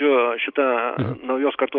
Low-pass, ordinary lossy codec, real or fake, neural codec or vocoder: 5.4 kHz; Opus, 32 kbps; real; none